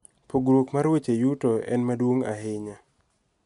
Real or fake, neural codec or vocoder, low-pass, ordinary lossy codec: real; none; 10.8 kHz; none